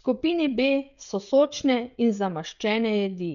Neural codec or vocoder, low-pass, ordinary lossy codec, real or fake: codec, 16 kHz, 16 kbps, FreqCodec, smaller model; 7.2 kHz; none; fake